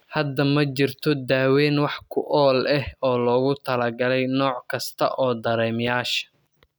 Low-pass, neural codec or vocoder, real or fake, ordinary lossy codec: none; none; real; none